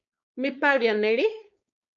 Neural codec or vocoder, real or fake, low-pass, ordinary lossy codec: codec, 16 kHz, 4.8 kbps, FACodec; fake; 7.2 kHz; MP3, 48 kbps